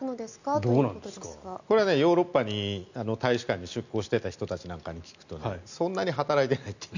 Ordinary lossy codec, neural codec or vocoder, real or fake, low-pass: none; none; real; 7.2 kHz